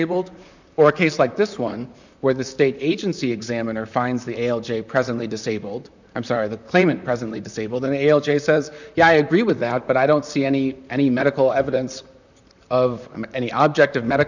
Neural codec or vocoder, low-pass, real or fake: vocoder, 44.1 kHz, 128 mel bands, Pupu-Vocoder; 7.2 kHz; fake